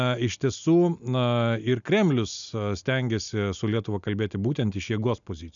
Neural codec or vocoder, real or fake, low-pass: none; real; 7.2 kHz